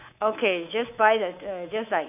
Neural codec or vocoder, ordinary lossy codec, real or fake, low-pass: codec, 24 kHz, 3.1 kbps, DualCodec; none; fake; 3.6 kHz